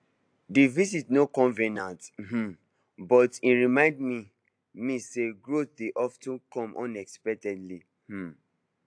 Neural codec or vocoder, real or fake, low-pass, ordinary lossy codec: none; real; 9.9 kHz; AAC, 64 kbps